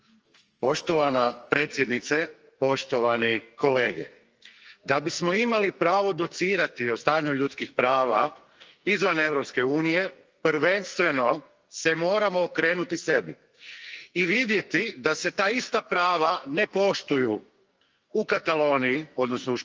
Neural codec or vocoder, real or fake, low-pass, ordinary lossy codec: codec, 44.1 kHz, 2.6 kbps, SNAC; fake; 7.2 kHz; Opus, 24 kbps